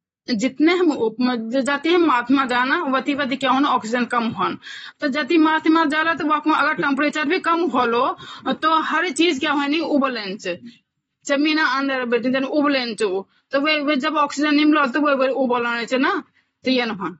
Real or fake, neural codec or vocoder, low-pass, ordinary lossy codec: real; none; 19.8 kHz; AAC, 24 kbps